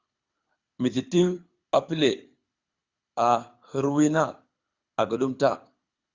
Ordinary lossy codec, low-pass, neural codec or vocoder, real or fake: Opus, 64 kbps; 7.2 kHz; codec, 24 kHz, 6 kbps, HILCodec; fake